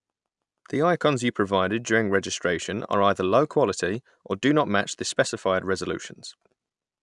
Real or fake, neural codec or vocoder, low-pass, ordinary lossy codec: real; none; 10.8 kHz; none